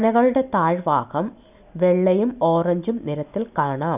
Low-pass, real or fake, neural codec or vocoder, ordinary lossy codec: 3.6 kHz; real; none; none